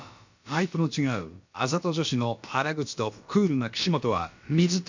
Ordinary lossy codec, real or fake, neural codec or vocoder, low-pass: MP3, 64 kbps; fake; codec, 16 kHz, about 1 kbps, DyCAST, with the encoder's durations; 7.2 kHz